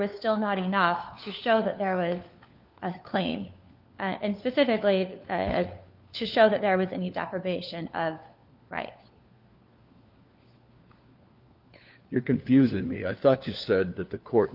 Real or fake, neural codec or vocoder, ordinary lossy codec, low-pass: fake; codec, 16 kHz, 4 kbps, FunCodec, trained on LibriTTS, 50 frames a second; Opus, 24 kbps; 5.4 kHz